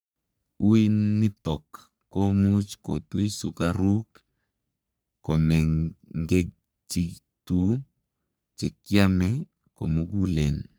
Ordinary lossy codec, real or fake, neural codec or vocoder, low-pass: none; fake; codec, 44.1 kHz, 3.4 kbps, Pupu-Codec; none